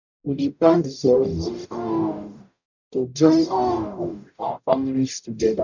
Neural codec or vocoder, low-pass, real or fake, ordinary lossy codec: codec, 44.1 kHz, 0.9 kbps, DAC; 7.2 kHz; fake; none